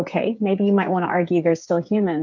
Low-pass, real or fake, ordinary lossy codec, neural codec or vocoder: 7.2 kHz; fake; MP3, 64 kbps; codec, 16 kHz, 8 kbps, FreqCodec, smaller model